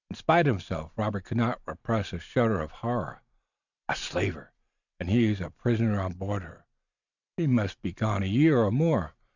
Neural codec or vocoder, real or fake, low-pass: vocoder, 44.1 kHz, 128 mel bands every 512 samples, BigVGAN v2; fake; 7.2 kHz